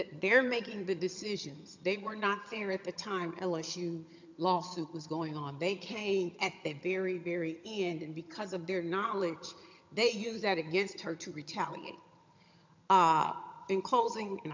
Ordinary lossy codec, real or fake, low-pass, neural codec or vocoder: MP3, 64 kbps; fake; 7.2 kHz; vocoder, 22.05 kHz, 80 mel bands, HiFi-GAN